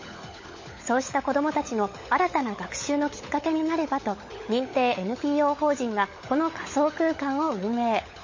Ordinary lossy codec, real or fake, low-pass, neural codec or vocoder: MP3, 32 kbps; fake; 7.2 kHz; codec, 16 kHz, 16 kbps, FunCodec, trained on LibriTTS, 50 frames a second